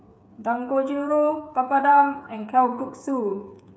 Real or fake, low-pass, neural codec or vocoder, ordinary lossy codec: fake; none; codec, 16 kHz, 8 kbps, FreqCodec, smaller model; none